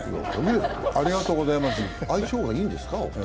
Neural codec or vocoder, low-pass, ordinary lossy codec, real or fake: none; none; none; real